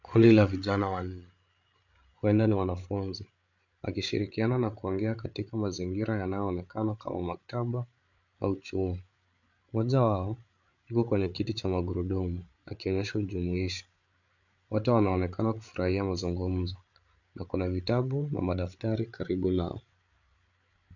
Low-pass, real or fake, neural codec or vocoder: 7.2 kHz; fake; codec, 16 kHz, 8 kbps, FreqCodec, larger model